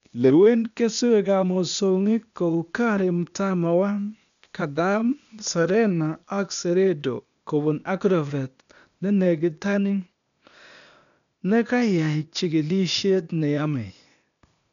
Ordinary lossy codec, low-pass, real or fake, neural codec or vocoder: MP3, 96 kbps; 7.2 kHz; fake; codec, 16 kHz, 0.8 kbps, ZipCodec